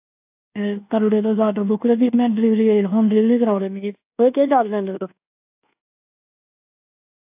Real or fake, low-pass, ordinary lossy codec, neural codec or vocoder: fake; 3.6 kHz; AAC, 32 kbps; codec, 24 kHz, 1.2 kbps, DualCodec